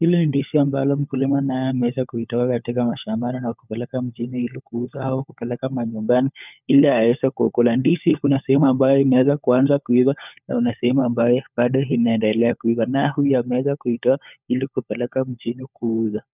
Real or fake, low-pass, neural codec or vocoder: fake; 3.6 kHz; codec, 16 kHz, 4 kbps, FunCodec, trained on LibriTTS, 50 frames a second